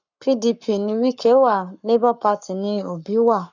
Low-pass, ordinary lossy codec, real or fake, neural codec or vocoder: 7.2 kHz; none; fake; codec, 44.1 kHz, 7.8 kbps, Pupu-Codec